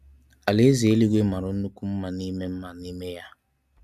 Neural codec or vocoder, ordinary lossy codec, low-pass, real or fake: none; none; 14.4 kHz; real